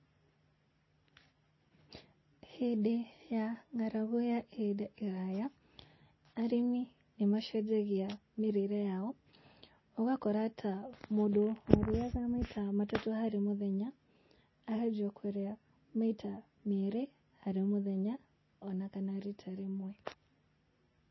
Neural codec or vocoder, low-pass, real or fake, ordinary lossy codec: none; 7.2 kHz; real; MP3, 24 kbps